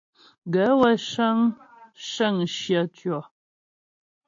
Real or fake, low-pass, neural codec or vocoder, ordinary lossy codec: real; 7.2 kHz; none; AAC, 48 kbps